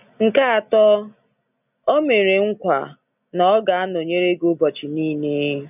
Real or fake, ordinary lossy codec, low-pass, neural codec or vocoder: real; none; 3.6 kHz; none